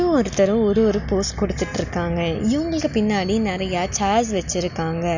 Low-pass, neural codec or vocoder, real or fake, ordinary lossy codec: 7.2 kHz; none; real; none